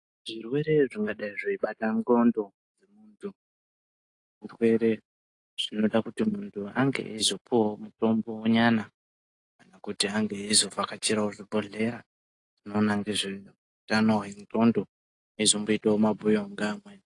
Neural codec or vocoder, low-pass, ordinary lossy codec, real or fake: none; 10.8 kHz; AAC, 48 kbps; real